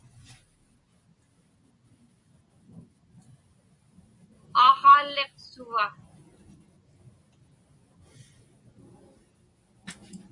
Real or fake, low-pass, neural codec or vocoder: real; 10.8 kHz; none